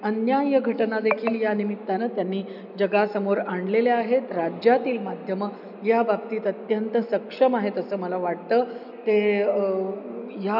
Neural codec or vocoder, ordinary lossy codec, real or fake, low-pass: none; none; real; 5.4 kHz